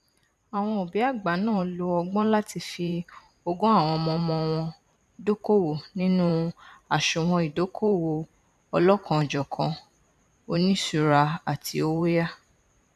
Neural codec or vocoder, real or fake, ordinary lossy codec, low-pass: vocoder, 44.1 kHz, 128 mel bands every 512 samples, BigVGAN v2; fake; none; 14.4 kHz